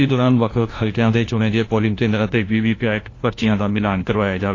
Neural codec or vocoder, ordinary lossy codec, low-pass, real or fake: codec, 16 kHz, 1 kbps, FunCodec, trained on LibriTTS, 50 frames a second; AAC, 32 kbps; 7.2 kHz; fake